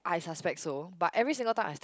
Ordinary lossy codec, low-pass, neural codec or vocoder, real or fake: none; none; none; real